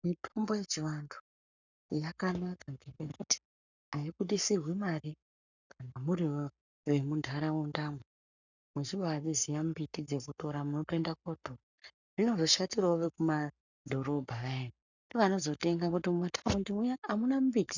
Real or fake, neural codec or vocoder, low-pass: fake; codec, 44.1 kHz, 7.8 kbps, Pupu-Codec; 7.2 kHz